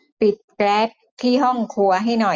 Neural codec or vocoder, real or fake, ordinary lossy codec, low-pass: none; real; none; none